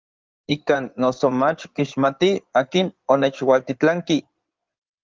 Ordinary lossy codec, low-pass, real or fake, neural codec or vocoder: Opus, 24 kbps; 7.2 kHz; fake; codec, 16 kHz in and 24 kHz out, 2.2 kbps, FireRedTTS-2 codec